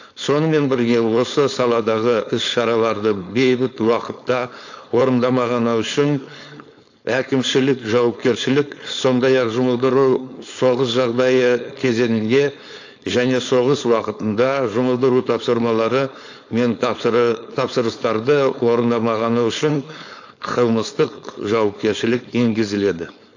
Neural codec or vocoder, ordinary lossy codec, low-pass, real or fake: codec, 16 kHz, 4.8 kbps, FACodec; AAC, 48 kbps; 7.2 kHz; fake